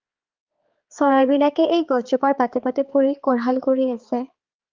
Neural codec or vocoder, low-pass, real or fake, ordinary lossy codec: codec, 16 kHz, 4 kbps, X-Codec, HuBERT features, trained on balanced general audio; 7.2 kHz; fake; Opus, 24 kbps